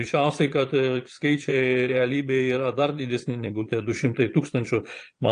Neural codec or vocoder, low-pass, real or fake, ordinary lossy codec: vocoder, 22.05 kHz, 80 mel bands, Vocos; 9.9 kHz; fake; AAC, 48 kbps